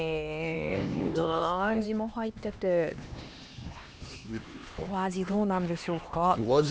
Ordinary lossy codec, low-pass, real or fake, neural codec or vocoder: none; none; fake; codec, 16 kHz, 2 kbps, X-Codec, HuBERT features, trained on LibriSpeech